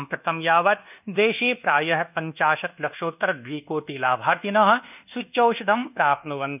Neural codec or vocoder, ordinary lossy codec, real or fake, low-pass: codec, 24 kHz, 1.2 kbps, DualCodec; none; fake; 3.6 kHz